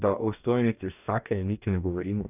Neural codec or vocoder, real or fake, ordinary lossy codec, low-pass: codec, 44.1 kHz, 2.6 kbps, DAC; fake; none; 3.6 kHz